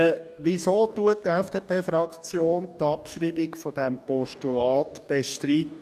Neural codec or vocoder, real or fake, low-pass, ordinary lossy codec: codec, 44.1 kHz, 2.6 kbps, DAC; fake; 14.4 kHz; none